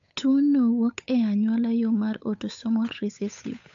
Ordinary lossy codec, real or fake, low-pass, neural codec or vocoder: AAC, 64 kbps; fake; 7.2 kHz; codec, 16 kHz, 8 kbps, FunCodec, trained on Chinese and English, 25 frames a second